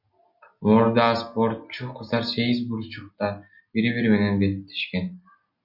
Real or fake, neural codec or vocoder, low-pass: real; none; 5.4 kHz